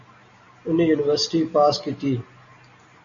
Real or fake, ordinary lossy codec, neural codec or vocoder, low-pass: real; MP3, 32 kbps; none; 7.2 kHz